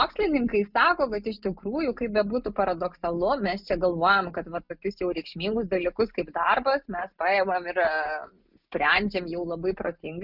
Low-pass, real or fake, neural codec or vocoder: 5.4 kHz; real; none